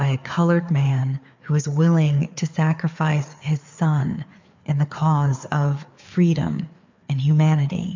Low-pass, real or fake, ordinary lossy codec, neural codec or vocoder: 7.2 kHz; fake; MP3, 64 kbps; codec, 16 kHz, 4 kbps, FreqCodec, larger model